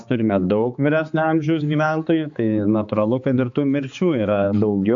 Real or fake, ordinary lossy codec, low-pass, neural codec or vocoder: fake; AAC, 64 kbps; 7.2 kHz; codec, 16 kHz, 4 kbps, X-Codec, HuBERT features, trained on balanced general audio